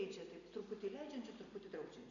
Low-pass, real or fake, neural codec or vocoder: 7.2 kHz; real; none